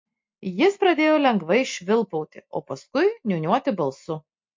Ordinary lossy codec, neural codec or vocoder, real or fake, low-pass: MP3, 48 kbps; none; real; 7.2 kHz